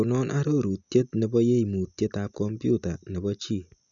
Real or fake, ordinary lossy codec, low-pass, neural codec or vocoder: real; none; 7.2 kHz; none